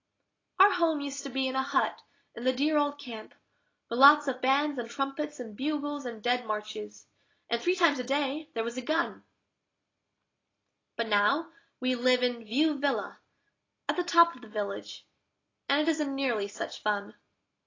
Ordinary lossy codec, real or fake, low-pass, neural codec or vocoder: AAC, 32 kbps; real; 7.2 kHz; none